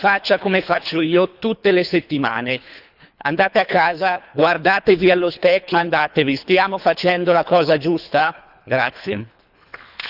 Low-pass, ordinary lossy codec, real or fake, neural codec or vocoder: 5.4 kHz; none; fake; codec, 24 kHz, 3 kbps, HILCodec